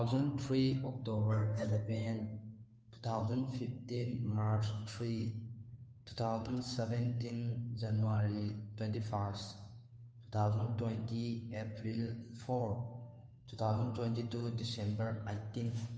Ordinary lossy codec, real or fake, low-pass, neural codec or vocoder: none; fake; none; codec, 16 kHz, 2 kbps, FunCodec, trained on Chinese and English, 25 frames a second